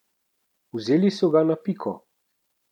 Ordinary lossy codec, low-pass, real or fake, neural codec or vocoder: none; 19.8 kHz; real; none